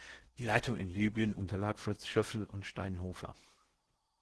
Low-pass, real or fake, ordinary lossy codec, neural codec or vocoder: 10.8 kHz; fake; Opus, 16 kbps; codec, 16 kHz in and 24 kHz out, 0.8 kbps, FocalCodec, streaming, 65536 codes